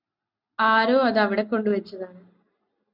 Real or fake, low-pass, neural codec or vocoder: real; 5.4 kHz; none